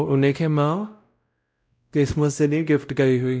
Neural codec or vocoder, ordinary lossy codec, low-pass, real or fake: codec, 16 kHz, 0.5 kbps, X-Codec, WavLM features, trained on Multilingual LibriSpeech; none; none; fake